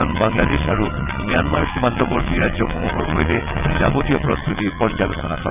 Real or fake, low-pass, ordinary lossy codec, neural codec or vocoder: fake; 3.6 kHz; none; vocoder, 22.05 kHz, 80 mel bands, WaveNeXt